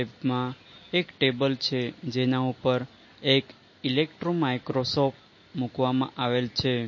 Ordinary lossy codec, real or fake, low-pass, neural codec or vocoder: MP3, 32 kbps; real; 7.2 kHz; none